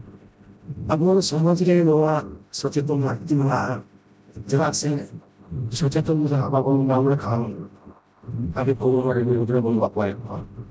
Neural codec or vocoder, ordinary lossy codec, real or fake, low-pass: codec, 16 kHz, 0.5 kbps, FreqCodec, smaller model; none; fake; none